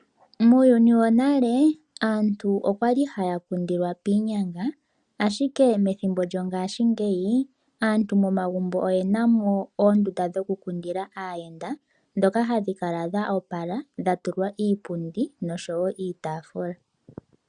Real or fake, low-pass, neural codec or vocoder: real; 9.9 kHz; none